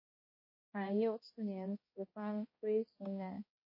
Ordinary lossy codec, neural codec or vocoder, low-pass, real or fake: MP3, 24 kbps; codec, 16 kHz, 1 kbps, X-Codec, HuBERT features, trained on balanced general audio; 5.4 kHz; fake